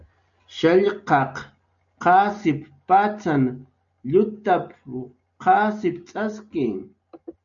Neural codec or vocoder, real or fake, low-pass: none; real; 7.2 kHz